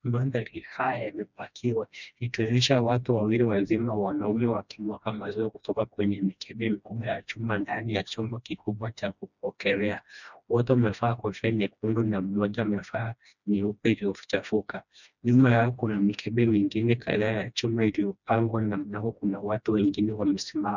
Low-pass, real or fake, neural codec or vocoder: 7.2 kHz; fake; codec, 16 kHz, 1 kbps, FreqCodec, smaller model